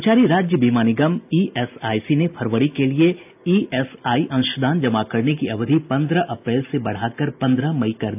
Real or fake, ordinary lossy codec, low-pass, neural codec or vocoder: real; AAC, 32 kbps; 3.6 kHz; none